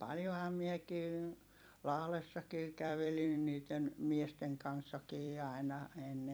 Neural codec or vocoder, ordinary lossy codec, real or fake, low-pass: vocoder, 44.1 kHz, 128 mel bands every 256 samples, BigVGAN v2; none; fake; none